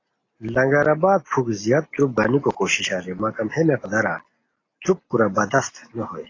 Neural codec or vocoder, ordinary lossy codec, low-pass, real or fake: none; AAC, 32 kbps; 7.2 kHz; real